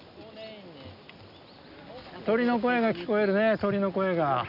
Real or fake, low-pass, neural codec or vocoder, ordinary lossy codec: real; 5.4 kHz; none; none